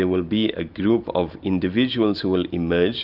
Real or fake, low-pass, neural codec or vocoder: real; 5.4 kHz; none